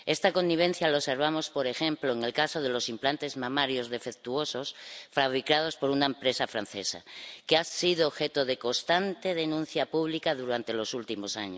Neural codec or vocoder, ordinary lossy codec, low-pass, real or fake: none; none; none; real